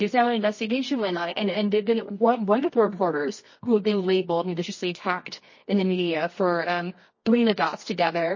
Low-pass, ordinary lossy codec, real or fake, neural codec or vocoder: 7.2 kHz; MP3, 32 kbps; fake; codec, 24 kHz, 0.9 kbps, WavTokenizer, medium music audio release